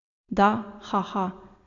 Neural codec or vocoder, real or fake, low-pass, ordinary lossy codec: none; real; 7.2 kHz; AAC, 64 kbps